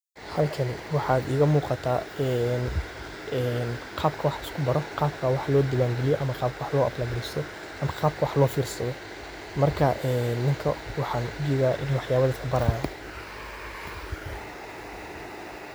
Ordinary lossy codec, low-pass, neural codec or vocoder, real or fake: none; none; none; real